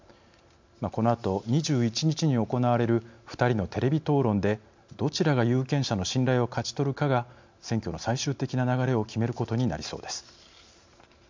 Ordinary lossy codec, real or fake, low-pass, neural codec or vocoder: MP3, 64 kbps; real; 7.2 kHz; none